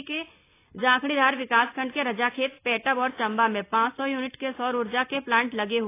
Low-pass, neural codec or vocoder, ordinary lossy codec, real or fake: 3.6 kHz; none; AAC, 24 kbps; real